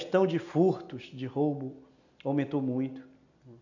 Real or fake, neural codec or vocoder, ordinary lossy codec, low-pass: real; none; none; 7.2 kHz